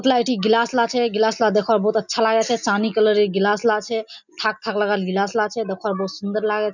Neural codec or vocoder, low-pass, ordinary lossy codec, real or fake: none; 7.2 kHz; none; real